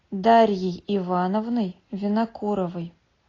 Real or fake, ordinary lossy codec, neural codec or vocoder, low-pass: real; AAC, 32 kbps; none; 7.2 kHz